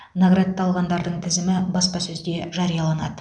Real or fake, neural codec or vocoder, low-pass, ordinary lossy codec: real; none; 9.9 kHz; none